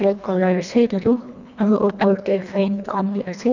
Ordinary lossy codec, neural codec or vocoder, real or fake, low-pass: none; codec, 24 kHz, 1.5 kbps, HILCodec; fake; 7.2 kHz